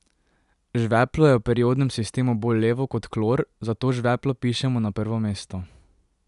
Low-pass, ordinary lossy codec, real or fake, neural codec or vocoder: 10.8 kHz; none; real; none